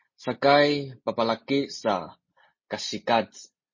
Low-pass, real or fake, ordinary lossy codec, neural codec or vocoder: 7.2 kHz; real; MP3, 32 kbps; none